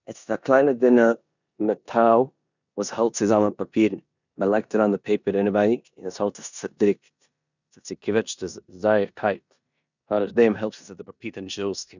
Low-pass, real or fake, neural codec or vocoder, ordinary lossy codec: 7.2 kHz; fake; codec, 16 kHz in and 24 kHz out, 0.9 kbps, LongCat-Audio-Codec, four codebook decoder; none